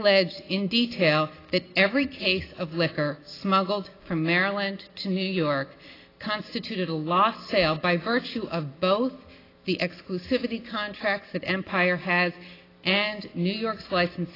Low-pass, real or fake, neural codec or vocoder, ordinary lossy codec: 5.4 kHz; real; none; AAC, 24 kbps